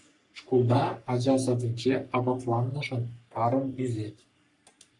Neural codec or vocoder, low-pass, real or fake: codec, 44.1 kHz, 3.4 kbps, Pupu-Codec; 10.8 kHz; fake